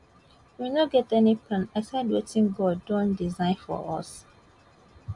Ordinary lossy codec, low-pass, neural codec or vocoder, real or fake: none; 10.8 kHz; none; real